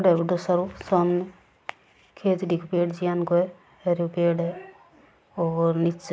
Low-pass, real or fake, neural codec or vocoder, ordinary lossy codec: none; real; none; none